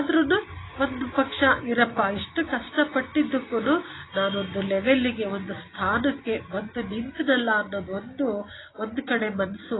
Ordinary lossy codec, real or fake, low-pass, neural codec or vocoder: AAC, 16 kbps; real; 7.2 kHz; none